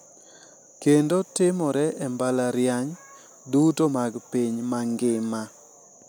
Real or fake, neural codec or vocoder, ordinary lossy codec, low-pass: real; none; none; none